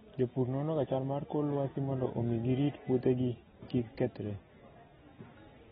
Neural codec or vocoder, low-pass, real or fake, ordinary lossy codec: none; 19.8 kHz; real; AAC, 16 kbps